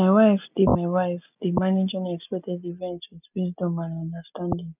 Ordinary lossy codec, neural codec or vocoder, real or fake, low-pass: none; none; real; 3.6 kHz